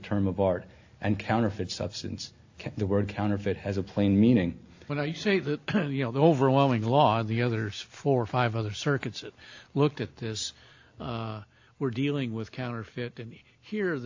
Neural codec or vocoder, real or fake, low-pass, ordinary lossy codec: none; real; 7.2 kHz; AAC, 48 kbps